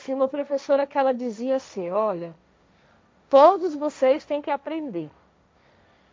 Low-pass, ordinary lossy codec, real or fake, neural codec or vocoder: none; none; fake; codec, 16 kHz, 1.1 kbps, Voila-Tokenizer